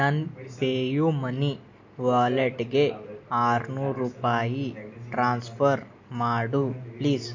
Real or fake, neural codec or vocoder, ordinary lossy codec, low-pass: real; none; MP3, 48 kbps; 7.2 kHz